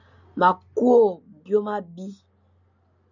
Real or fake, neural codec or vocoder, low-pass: real; none; 7.2 kHz